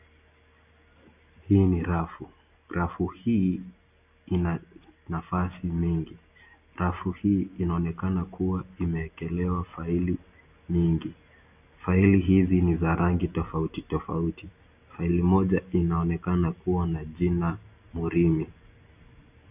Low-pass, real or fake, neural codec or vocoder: 3.6 kHz; real; none